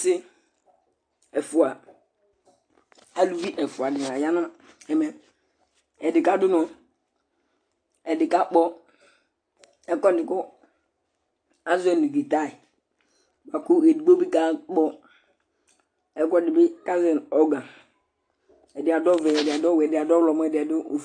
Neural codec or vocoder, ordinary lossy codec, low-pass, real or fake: none; AAC, 48 kbps; 9.9 kHz; real